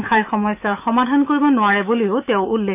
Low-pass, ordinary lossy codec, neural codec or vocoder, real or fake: 3.6 kHz; none; autoencoder, 48 kHz, 128 numbers a frame, DAC-VAE, trained on Japanese speech; fake